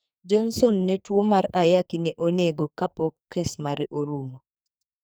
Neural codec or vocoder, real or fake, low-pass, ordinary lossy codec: codec, 44.1 kHz, 2.6 kbps, SNAC; fake; none; none